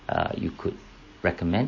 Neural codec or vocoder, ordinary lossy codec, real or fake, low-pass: none; MP3, 32 kbps; real; 7.2 kHz